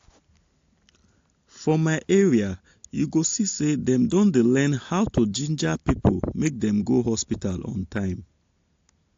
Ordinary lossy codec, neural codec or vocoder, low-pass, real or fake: AAC, 48 kbps; none; 7.2 kHz; real